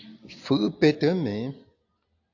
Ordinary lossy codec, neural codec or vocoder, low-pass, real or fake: AAC, 48 kbps; none; 7.2 kHz; real